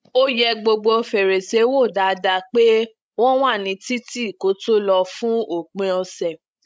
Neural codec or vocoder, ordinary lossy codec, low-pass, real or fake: codec, 16 kHz, 16 kbps, FreqCodec, larger model; none; none; fake